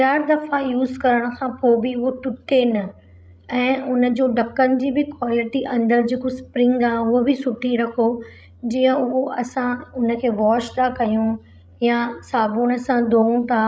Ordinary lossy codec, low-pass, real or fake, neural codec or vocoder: none; none; fake; codec, 16 kHz, 16 kbps, FreqCodec, larger model